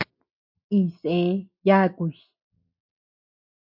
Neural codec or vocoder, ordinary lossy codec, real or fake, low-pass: none; MP3, 48 kbps; real; 5.4 kHz